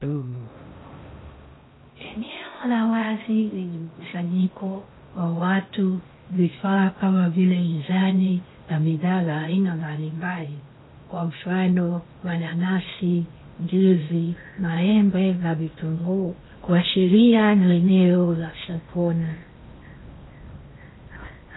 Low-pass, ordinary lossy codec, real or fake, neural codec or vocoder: 7.2 kHz; AAC, 16 kbps; fake; codec, 16 kHz in and 24 kHz out, 0.6 kbps, FocalCodec, streaming, 4096 codes